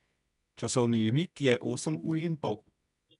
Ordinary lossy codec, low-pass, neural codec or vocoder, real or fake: none; 10.8 kHz; codec, 24 kHz, 0.9 kbps, WavTokenizer, medium music audio release; fake